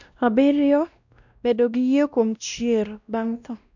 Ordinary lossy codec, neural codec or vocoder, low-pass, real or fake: none; codec, 16 kHz, 1 kbps, X-Codec, WavLM features, trained on Multilingual LibriSpeech; 7.2 kHz; fake